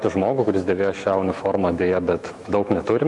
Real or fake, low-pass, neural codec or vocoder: fake; 10.8 kHz; autoencoder, 48 kHz, 128 numbers a frame, DAC-VAE, trained on Japanese speech